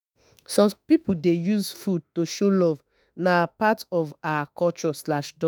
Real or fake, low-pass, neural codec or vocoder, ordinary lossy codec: fake; none; autoencoder, 48 kHz, 32 numbers a frame, DAC-VAE, trained on Japanese speech; none